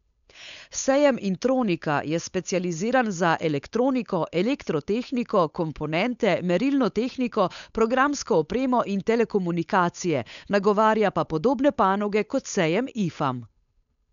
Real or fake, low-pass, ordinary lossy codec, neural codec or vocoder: fake; 7.2 kHz; none; codec, 16 kHz, 8 kbps, FunCodec, trained on Chinese and English, 25 frames a second